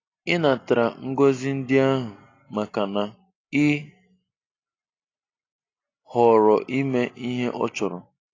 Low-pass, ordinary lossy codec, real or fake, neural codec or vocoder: 7.2 kHz; AAC, 32 kbps; real; none